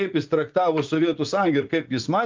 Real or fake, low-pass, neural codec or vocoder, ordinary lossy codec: fake; 7.2 kHz; vocoder, 44.1 kHz, 80 mel bands, Vocos; Opus, 32 kbps